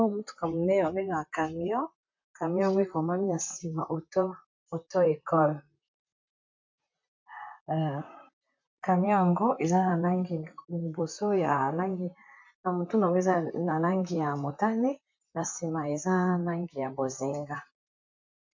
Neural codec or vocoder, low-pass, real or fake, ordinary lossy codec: vocoder, 44.1 kHz, 128 mel bands, Pupu-Vocoder; 7.2 kHz; fake; MP3, 48 kbps